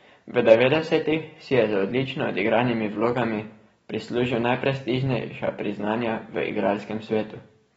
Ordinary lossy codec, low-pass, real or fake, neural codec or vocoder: AAC, 24 kbps; 19.8 kHz; real; none